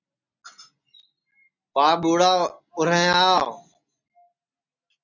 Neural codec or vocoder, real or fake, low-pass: none; real; 7.2 kHz